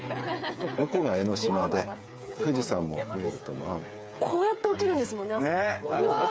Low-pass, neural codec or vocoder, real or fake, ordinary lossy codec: none; codec, 16 kHz, 8 kbps, FreqCodec, smaller model; fake; none